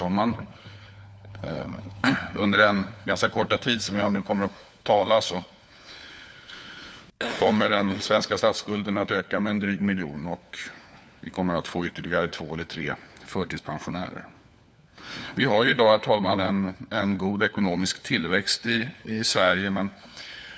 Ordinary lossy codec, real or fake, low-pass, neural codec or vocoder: none; fake; none; codec, 16 kHz, 4 kbps, FunCodec, trained on LibriTTS, 50 frames a second